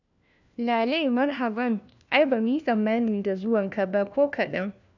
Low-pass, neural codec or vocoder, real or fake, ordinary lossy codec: 7.2 kHz; codec, 16 kHz, 1 kbps, FunCodec, trained on LibriTTS, 50 frames a second; fake; none